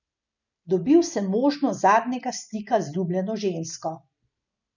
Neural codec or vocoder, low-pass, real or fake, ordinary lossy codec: none; 7.2 kHz; real; none